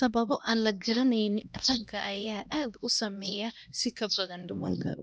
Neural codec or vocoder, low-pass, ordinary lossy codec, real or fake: codec, 16 kHz, 1 kbps, X-Codec, HuBERT features, trained on LibriSpeech; none; none; fake